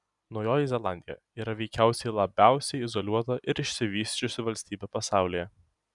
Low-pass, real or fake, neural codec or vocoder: 10.8 kHz; real; none